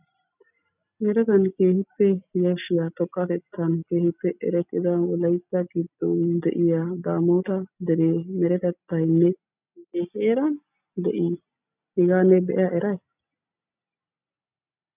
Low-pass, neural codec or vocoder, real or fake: 3.6 kHz; none; real